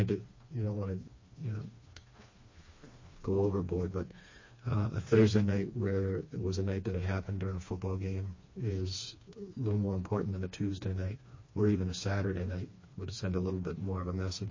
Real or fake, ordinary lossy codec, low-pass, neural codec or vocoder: fake; MP3, 32 kbps; 7.2 kHz; codec, 16 kHz, 2 kbps, FreqCodec, smaller model